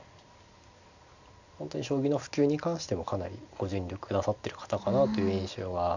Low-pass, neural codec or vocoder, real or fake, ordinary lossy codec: 7.2 kHz; none; real; none